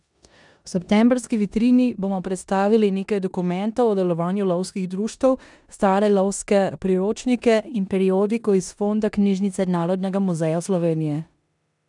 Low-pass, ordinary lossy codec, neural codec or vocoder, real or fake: 10.8 kHz; none; codec, 16 kHz in and 24 kHz out, 0.9 kbps, LongCat-Audio-Codec, four codebook decoder; fake